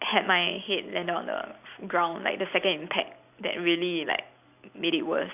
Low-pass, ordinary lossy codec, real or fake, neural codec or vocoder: 3.6 kHz; AAC, 32 kbps; real; none